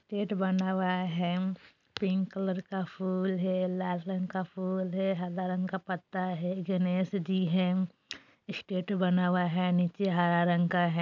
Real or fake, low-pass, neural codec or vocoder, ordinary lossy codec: real; 7.2 kHz; none; none